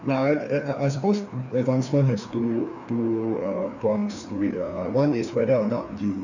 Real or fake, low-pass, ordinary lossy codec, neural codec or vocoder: fake; 7.2 kHz; none; codec, 16 kHz, 2 kbps, FreqCodec, larger model